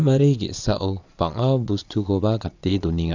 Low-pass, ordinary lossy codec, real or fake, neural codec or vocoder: 7.2 kHz; none; fake; vocoder, 22.05 kHz, 80 mel bands, Vocos